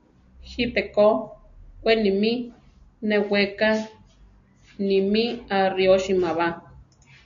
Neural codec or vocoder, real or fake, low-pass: none; real; 7.2 kHz